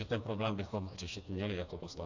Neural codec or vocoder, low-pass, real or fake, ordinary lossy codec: codec, 16 kHz, 2 kbps, FreqCodec, smaller model; 7.2 kHz; fake; AAC, 48 kbps